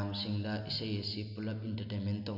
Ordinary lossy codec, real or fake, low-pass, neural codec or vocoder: none; real; 5.4 kHz; none